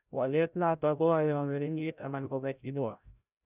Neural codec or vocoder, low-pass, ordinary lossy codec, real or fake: codec, 16 kHz, 0.5 kbps, FreqCodec, larger model; 3.6 kHz; none; fake